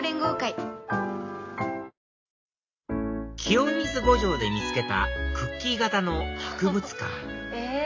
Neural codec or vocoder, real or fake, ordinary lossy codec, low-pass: none; real; AAC, 48 kbps; 7.2 kHz